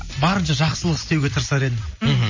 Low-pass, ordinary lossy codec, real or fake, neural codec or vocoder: 7.2 kHz; MP3, 32 kbps; real; none